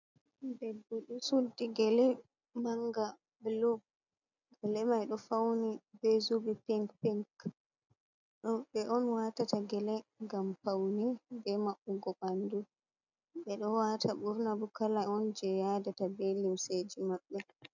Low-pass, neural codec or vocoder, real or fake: 7.2 kHz; none; real